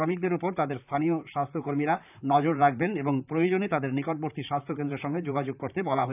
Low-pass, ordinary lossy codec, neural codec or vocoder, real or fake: 3.6 kHz; none; vocoder, 44.1 kHz, 128 mel bands, Pupu-Vocoder; fake